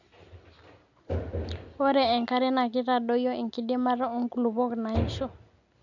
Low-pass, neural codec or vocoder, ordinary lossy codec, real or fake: 7.2 kHz; none; none; real